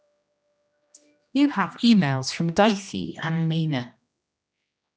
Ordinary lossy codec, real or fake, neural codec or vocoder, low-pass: none; fake; codec, 16 kHz, 1 kbps, X-Codec, HuBERT features, trained on general audio; none